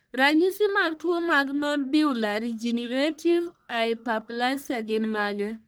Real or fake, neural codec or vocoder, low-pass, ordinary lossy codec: fake; codec, 44.1 kHz, 1.7 kbps, Pupu-Codec; none; none